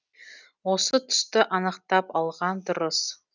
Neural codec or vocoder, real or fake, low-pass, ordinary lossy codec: none; real; 7.2 kHz; none